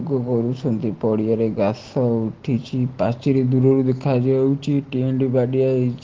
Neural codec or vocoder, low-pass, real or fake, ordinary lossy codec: none; 7.2 kHz; real; Opus, 16 kbps